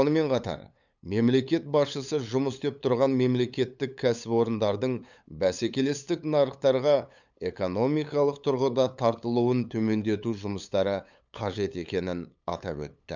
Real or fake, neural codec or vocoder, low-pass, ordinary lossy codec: fake; codec, 16 kHz, 8 kbps, FunCodec, trained on LibriTTS, 25 frames a second; 7.2 kHz; none